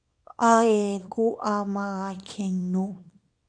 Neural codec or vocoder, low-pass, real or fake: codec, 24 kHz, 0.9 kbps, WavTokenizer, small release; 9.9 kHz; fake